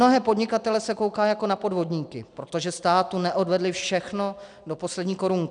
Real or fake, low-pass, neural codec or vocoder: real; 9.9 kHz; none